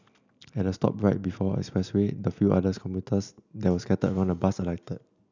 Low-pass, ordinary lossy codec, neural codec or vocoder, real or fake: 7.2 kHz; none; none; real